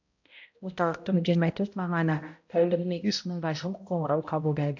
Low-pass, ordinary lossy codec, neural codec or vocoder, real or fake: 7.2 kHz; AAC, 48 kbps; codec, 16 kHz, 0.5 kbps, X-Codec, HuBERT features, trained on balanced general audio; fake